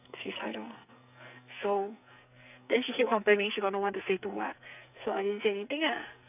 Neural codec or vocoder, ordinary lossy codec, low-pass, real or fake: codec, 44.1 kHz, 2.6 kbps, SNAC; none; 3.6 kHz; fake